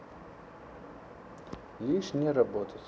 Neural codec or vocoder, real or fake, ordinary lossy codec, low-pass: none; real; none; none